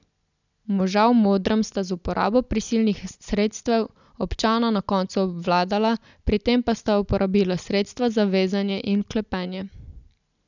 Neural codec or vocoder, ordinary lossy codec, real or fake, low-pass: none; none; real; 7.2 kHz